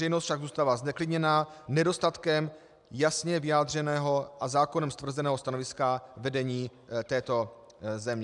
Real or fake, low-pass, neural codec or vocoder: real; 10.8 kHz; none